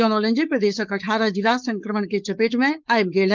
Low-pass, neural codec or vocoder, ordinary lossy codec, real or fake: 7.2 kHz; codec, 16 kHz, 4.8 kbps, FACodec; Opus, 32 kbps; fake